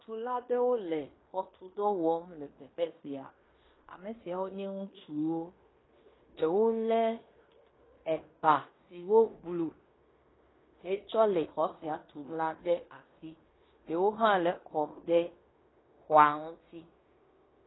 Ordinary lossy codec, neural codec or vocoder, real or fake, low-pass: AAC, 16 kbps; codec, 16 kHz in and 24 kHz out, 0.9 kbps, LongCat-Audio-Codec, fine tuned four codebook decoder; fake; 7.2 kHz